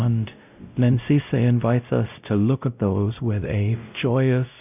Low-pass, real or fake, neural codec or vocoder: 3.6 kHz; fake; codec, 16 kHz, 0.5 kbps, X-Codec, WavLM features, trained on Multilingual LibriSpeech